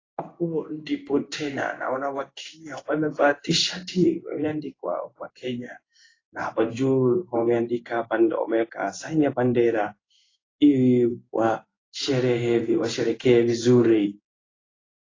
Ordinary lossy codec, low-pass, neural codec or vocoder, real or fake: AAC, 32 kbps; 7.2 kHz; codec, 16 kHz in and 24 kHz out, 1 kbps, XY-Tokenizer; fake